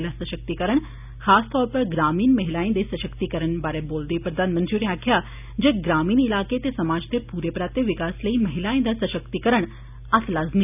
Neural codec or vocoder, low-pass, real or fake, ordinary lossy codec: none; 3.6 kHz; real; none